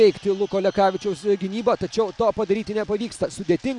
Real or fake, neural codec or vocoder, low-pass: real; none; 10.8 kHz